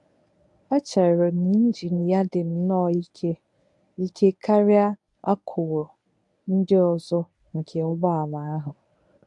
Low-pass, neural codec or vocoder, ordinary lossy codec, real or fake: 10.8 kHz; codec, 24 kHz, 0.9 kbps, WavTokenizer, medium speech release version 1; AAC, 64 kbps; fake